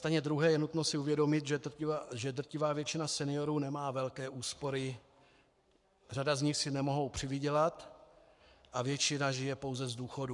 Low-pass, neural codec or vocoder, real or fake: 10.8 kHz; codec, 44.1 kHz, 7.8 kbps, Pupu-Codec; fake